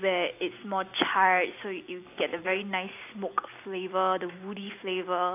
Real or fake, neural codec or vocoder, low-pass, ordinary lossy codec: real; none; 3.6 kHz; AAC, 24 kbps